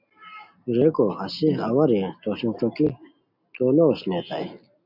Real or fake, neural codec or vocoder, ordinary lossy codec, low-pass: real; none; MP3, 48 kbps; 5.4 kHz